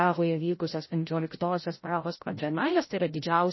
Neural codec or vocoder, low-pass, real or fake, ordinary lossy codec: codec, 16 kHz, 0.5 kbps, FreqCodec, larger model; 7.2 kHz; fake; MP3, 24 kbps